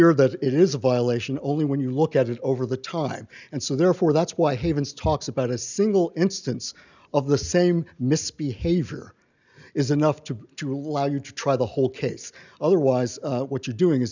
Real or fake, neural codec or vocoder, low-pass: real; none; 7.2 kHz